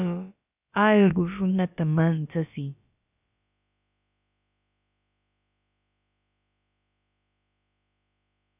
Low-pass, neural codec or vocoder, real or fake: 3.6 kHz; codec, 16 kHz, about 1 kbps, DyCAST, with the encoder's durations; fake